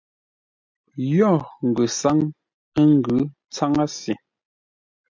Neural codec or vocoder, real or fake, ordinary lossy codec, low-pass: none; real; MP3, 64 kbps; 7.2 kHz